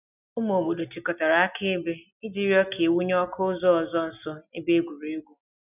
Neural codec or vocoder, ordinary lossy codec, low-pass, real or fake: none; none; 3.6 kHz; real